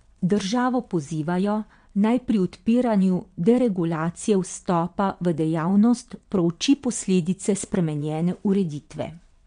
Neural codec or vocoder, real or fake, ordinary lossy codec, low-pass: vocoder, 22.05 kHz, 80 mel bands, WaveNeXt; fake; MP3, 64 kbps; 9.9 kHz